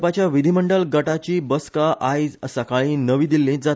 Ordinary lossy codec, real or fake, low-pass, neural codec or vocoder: none; real; none; none